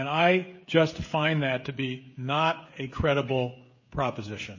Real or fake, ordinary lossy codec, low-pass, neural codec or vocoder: fake; MP3, 32 kbps; 7.2 kHz; codec, 16 kHz, 16 kbps, FreqCodec, smaller model